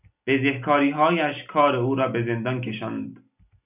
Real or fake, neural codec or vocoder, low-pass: real; none; 3.6 kHz